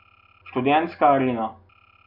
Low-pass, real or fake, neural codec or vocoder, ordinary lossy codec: 5.4 kHz; real; none; none